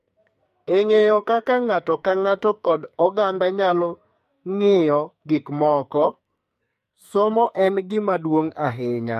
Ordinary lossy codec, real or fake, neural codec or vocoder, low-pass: MP3, 64 kbps; fake; codec, 44.1 kHz, 2.6 kbps, SNAC; 14.4 kHz